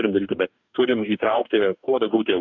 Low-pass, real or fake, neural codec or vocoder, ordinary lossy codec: 7.2 kHz; fake; codec, 44.1 kHz, 2.6 kbps, DAC; MP3, 64 kbps